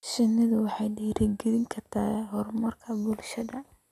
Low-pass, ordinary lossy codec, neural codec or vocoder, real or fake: 14.4 kHz; none; none; real